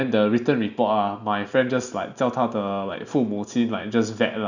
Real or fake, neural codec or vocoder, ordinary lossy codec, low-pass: real; none; none; 7.2 kHz